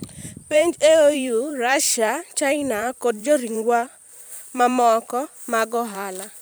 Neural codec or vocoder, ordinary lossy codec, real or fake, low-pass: vocoder, 44.1 kHz, 128 mel bands every 512 samples, BigVGAN v2; none; fake; none